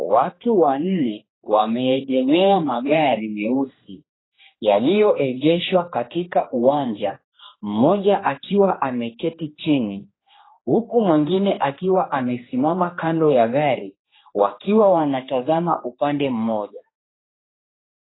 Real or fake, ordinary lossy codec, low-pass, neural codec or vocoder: fake; AAC, 16 kbps; 7.2 kHz; codec, 16 kHz, 2 kbps, X-Codec, HuBERT features, trained on general audio